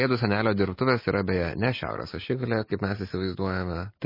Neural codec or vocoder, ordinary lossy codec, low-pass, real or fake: none; MP3, 24 kbps; 5.4 kHz; real